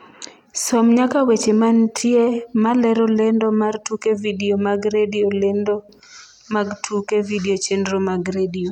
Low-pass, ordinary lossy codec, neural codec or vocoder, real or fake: 19.8 kHz; none; none; real